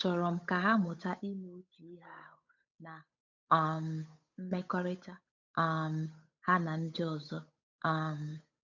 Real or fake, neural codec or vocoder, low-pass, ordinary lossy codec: fake; codec, 16 kHz, 8 kbps, FunCodec, trained on Chinese and English, 25 frames a second; 7.2 kHz; none